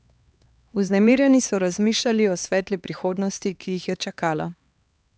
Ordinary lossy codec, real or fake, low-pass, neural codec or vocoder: none; fake; none; codec, 16 kHz, 2 kbps, X-Codec, HuBERT features, trained on LibriSpeech